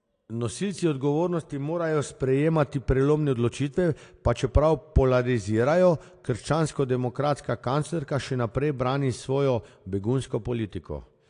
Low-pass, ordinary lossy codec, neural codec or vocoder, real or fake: 9.9 kHz; AAC, 48 kbps; none; real